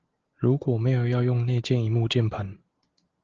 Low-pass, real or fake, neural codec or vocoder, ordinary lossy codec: 7.2 kHz; real; none; Opus, 16 kbps